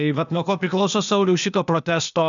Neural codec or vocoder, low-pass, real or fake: codec, 16 kHz, 0.8 kbps, ZipCodec; 7.2 kHz; fake